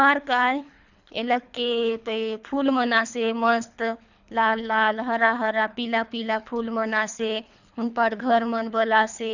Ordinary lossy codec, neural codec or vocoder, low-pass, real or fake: none; codec, 24 kHz, 3 kbps, HILCodec; 7.2 kHz; fake